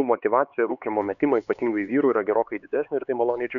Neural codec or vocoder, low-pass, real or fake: codec, 16 kHz, 4 kbps, X-Codec, HuBERT features, trained on LibriSpeech; 7.2 kHz; fake